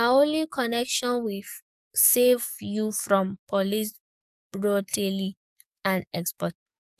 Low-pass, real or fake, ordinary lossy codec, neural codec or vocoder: 14.4 kHz; fake; none; codec, 44.1 kHz, 7.8 kbps, DAC